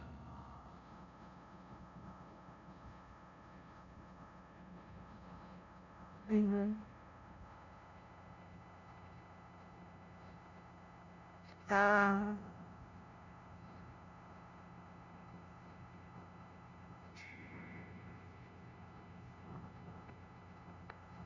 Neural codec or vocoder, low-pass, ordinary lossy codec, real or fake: codec, 16 kHz, 0.5 kbps, FunCodec, trained on LibriTTS, 25 frames a second; 7.2 kHz; none; fake